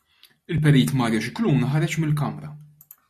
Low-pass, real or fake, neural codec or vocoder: 14.4 kHz; real; none